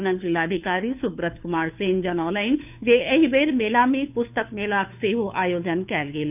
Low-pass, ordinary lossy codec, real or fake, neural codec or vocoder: 3.6 kHz; none; fake; codec, 16 kHz, 2 kbps, FunCodec, trained on Chinese and English, 25 frames a second